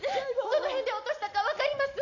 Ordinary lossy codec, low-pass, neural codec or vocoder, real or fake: none; 7.2 kHz; none; real